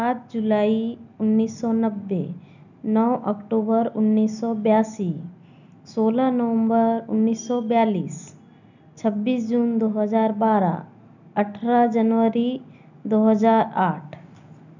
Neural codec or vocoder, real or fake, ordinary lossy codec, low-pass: none; real; none; 7.2 kHz